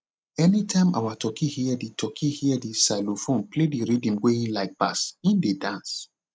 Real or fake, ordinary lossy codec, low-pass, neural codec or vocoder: real; none; none; none